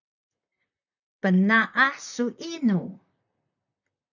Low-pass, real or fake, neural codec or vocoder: 7.2 kHz; fake; vocoder, 44.1 kHz, 128 mel bands, Pupu-Vocoder